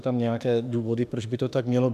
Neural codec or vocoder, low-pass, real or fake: autoencoder, 48 kHz, 32 numbers a frame, DAC-VAE, trained on Japanese speech; 14.4 kHz; fake